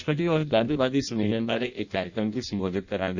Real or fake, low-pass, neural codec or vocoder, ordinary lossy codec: fake; 7.2 kHz; codec, 16 kHz in and 24 kHz out, 0.6 kbps, FireRedTTS-2 codec; none